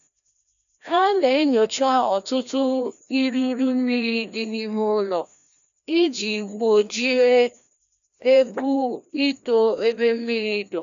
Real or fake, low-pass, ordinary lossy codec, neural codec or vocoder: fake; 7.2 kHz; none; codec, 16 kHz, 1 kbps, FreqCodec, larger model